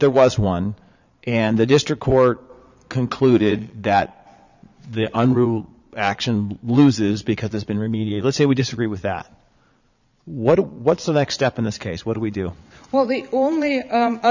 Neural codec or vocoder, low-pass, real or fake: vocoder, 22.05 kHz, 80 mel bands, Vocos; 7.2 kHz; fake